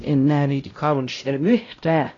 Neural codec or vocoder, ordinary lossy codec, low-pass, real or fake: codec, 16 kHz, 0.5 kbps, X-Codec, HuBERT features, trained on LibriSpeech; AAC, 32 kbps; 7.2 kHz; fake